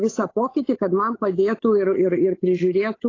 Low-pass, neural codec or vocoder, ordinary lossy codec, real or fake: 7.2 kHz; codec, 16 kHz, 8 kbps, FunCodec, trained on Chinese and English, 25 frames a second; AAC, 32 kbps; fake